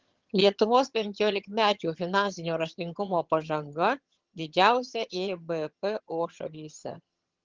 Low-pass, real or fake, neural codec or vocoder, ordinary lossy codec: 7.2 kHz; fake; vocoder, 22.05 kHz, 80 mel bands, HiFi-GAN; Opus, 16 kbps